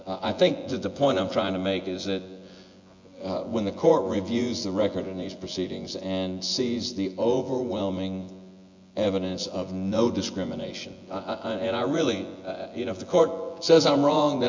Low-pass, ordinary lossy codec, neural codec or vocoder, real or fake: 7.2 kHz; MP3, 48 kbps; vocoder, 24 kHz, 100 mel bands, Vocos; fake